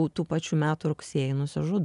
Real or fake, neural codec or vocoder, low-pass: real; none; 10.8 kHz